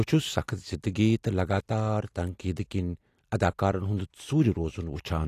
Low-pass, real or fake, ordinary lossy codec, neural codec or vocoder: 14.4 kHz; real; AAC, 48 kbps; none